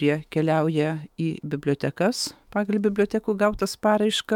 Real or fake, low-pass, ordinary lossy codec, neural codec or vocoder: fake; 19.8 kHz; MP3, 96 kbps; autoencoder, 48 kHz, 128 numbers a frame, DAC-VAE, trained on Japanese speech